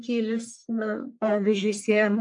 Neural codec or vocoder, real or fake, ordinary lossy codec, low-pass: codec, 44.1 kHz, 1.7 kbps, Pupu-Codec; fake; AAC, 64 kbps; 10.8 kHz